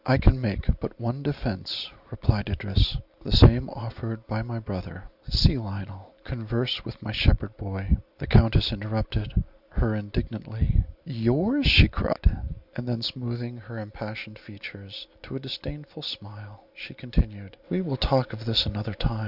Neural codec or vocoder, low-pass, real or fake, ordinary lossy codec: none; 5.4 kHz; real; Opus, 64 kbps